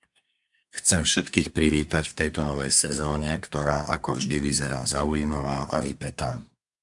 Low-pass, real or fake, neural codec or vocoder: 10.8 kHz; fake; codec, 24 kHz, 1 kbps, SNAC